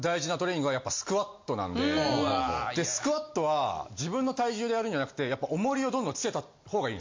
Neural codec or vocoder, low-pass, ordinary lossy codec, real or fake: none; 7.2 kHz; MP3, 48 kbps; real